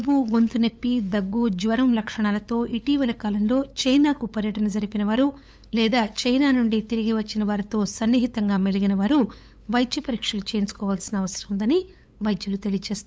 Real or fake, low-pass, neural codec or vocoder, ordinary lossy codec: fake; none; codec, 16 kHz, 8 kbps, FunCodec, trained on LibriTTS, 25 frames a second; none